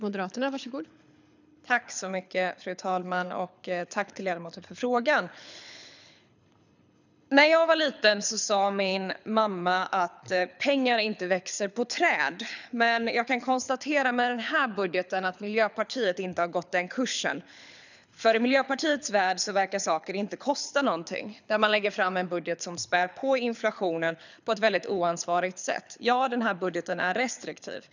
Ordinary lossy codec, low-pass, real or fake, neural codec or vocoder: none; 7.2 kHz; fake; codec, 24 kHz, 6 kbps, HILCodec